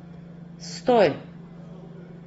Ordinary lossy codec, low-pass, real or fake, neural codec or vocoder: AAC, 24 kbps; 19.8 kHz; real; none